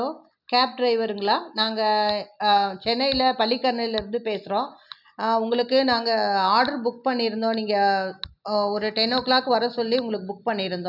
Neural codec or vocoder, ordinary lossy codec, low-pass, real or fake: none; none; 5.4 kHz; real